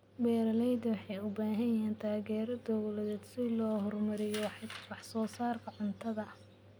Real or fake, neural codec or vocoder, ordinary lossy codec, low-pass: real; none; none; none